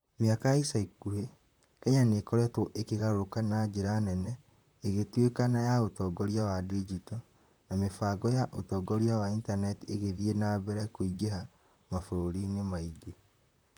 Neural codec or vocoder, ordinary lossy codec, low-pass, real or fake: vocoder, 44.1 kHz, 128 mel bands, Pupu-Vocoder; none; none; fake